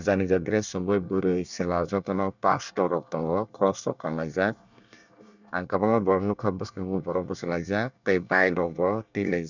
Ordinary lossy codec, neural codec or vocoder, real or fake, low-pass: none; codec, 24 kHz, 1 kbps, SNAC; fake; 7.2 kHz